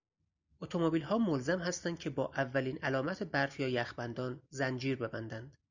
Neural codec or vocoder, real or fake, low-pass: none; real; 7.2 kHz